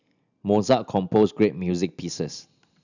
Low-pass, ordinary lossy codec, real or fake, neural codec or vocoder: 7.2 kHz; none; real; none